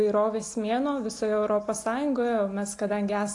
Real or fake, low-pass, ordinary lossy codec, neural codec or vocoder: real; 10.8 kHz; AAC, 48 kbps; none